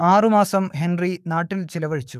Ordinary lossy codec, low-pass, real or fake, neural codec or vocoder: none; 14.4 kHz; fake; codec, 44.1 kHz, 7.8 kbps, DAC